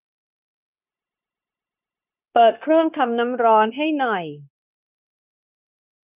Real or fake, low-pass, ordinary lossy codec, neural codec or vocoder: fake; 3.6 kHz; none; codec, 16 kHz, 0.9 kbps, LongCat-Audio-Codec